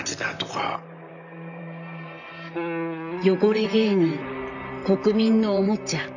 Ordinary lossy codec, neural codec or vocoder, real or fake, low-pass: none; vocoder, 44.1 kHz, 128 mel bands, Pupu-Vocoder; fake; 7.2 kHz